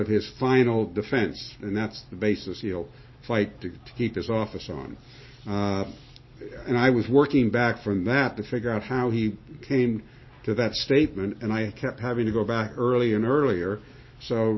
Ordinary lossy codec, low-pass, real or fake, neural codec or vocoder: MP3, 24 kbps; 7.2 kHz; real; none